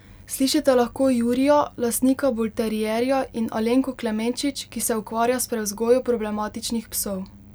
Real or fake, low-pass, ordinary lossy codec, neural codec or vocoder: real; none; none; none